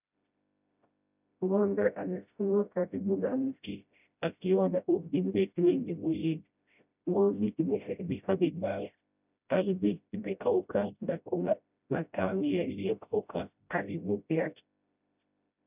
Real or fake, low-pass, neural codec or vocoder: fake; 3.6 kHz; codec, 16 kHz, 0.5 kbps, FreqCodec, smaller model